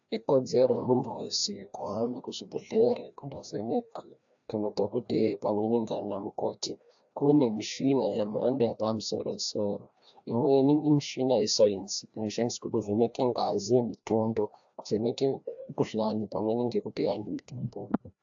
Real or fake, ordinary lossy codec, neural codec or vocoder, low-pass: fake; AAC, 64 kbps; codec, 16 kHz, 1 kbps, FreqCodec, larger model; 7.2 kHz